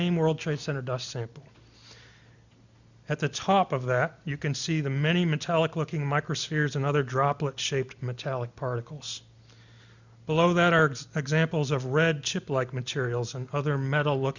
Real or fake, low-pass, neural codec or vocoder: real; 7.2 kHz; none